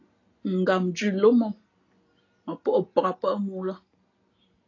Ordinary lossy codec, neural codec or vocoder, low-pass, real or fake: AAC, 32 kbps; none; 7.2 kHz; real